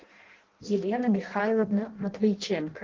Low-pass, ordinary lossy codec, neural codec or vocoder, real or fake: 7.2 kHz; Opus, 16 kbps; codec, 16 kHz in and 24 kHz out, 0.6 kbps, FireRedTTS-2 codec; fake